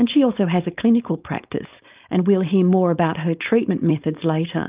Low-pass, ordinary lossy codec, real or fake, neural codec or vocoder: 3.6 kHz; Opus, 32 kbps; fake; codec, 16 kHz, 4.8 kbps, FACodec